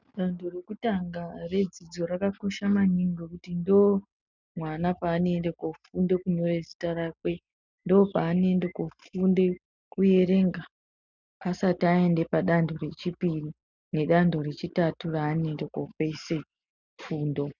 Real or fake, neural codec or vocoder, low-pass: real; none; 7.2 kHz